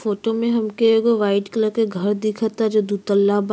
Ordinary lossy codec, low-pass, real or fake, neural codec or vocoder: none; none; real; none